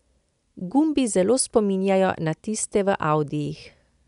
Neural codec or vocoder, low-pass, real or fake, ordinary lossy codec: none; 10.8 kHz; real; none